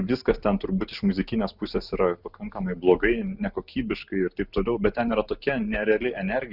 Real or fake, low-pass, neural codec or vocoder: real; 5.4 kHz; none